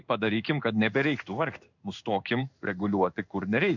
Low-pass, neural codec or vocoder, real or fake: 7.2 kHz; codec, 16 kHz in and 24 kHz out, 1 kbps, XY-Tokenizer; fake